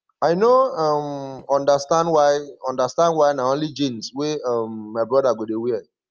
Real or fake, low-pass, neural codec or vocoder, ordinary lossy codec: real; 7.2 kHz; none; Opus, 24 kbps